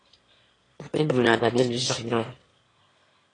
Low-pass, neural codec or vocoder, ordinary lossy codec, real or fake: 9.9 kHz; autoencoder, 22.05 kHz, a latent of 192 numbers a frame, VITS, trained on one speaker; AAC, 32 kbps; fake